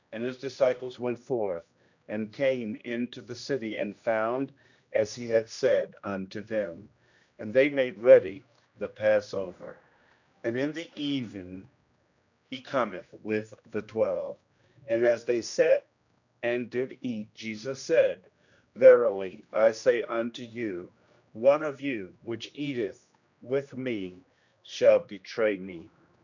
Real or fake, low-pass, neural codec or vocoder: fake; 7.2 kHz; codec, 16 kHz, 1 kbps, X-Codec, HuBERT features, trained on general audio